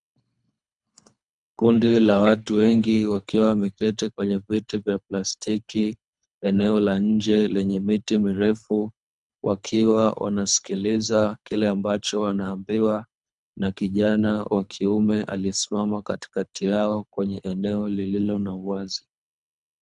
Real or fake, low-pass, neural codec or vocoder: fake; 10.8 kHz; codec, 24 kHz, 3 kbps, HILCodec